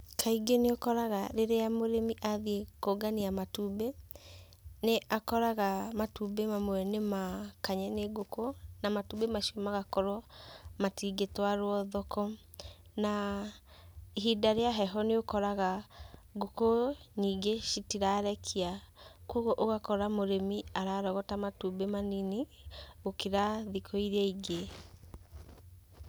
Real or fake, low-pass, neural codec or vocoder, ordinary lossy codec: real; none; none; none